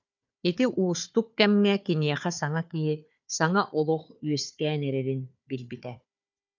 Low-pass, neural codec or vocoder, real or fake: 7.2 kHz; codec, 16 kHz, 4 kbps, FunCodec, trained on Chinese and English, 50 frames a second; fake